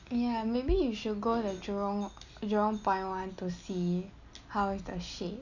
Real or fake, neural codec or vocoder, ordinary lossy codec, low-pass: real; none; none; 7.2 kHz